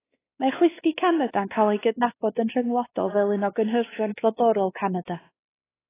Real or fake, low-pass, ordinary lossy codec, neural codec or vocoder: fake; 3.6 kHz; AAC, 16 kbps; codec, 16 kHz, 2 kbps, X-Codec, WavLM features, trained on Multilingual LibriSpeech